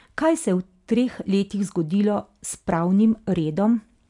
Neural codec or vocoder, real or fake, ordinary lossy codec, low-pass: none; real; none; 10.8 kHz